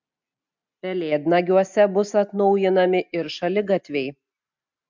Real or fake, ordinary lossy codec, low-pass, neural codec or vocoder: real; MP3, 64 kbps; 7.2 kHz; none